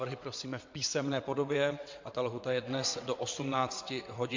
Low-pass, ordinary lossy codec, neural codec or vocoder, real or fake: 7.2 kHz; MP3, 48 kbps; vocoder, 22.05 kHz, 80 mel bands, WaveNeXt; fake